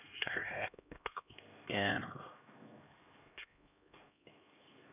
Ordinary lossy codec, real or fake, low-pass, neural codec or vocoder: none; fake; 3.6 kHz; codec, 16 kHz, 1 kbps, X-Codec, HuBERT features, trained on LibriSpeech